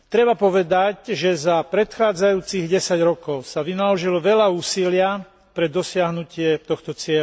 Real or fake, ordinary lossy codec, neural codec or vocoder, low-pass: real; none; none; none